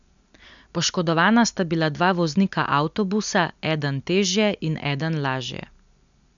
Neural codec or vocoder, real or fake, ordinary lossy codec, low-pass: none; real; none; 7.2 kHz